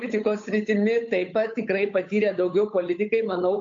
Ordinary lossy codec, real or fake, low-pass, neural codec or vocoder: AAC, 48 kbps; fake; 7.2 kHz; codec, 16 kHz, 8 kbps, FunCodec, trained on Chinese and English, 25 frames a second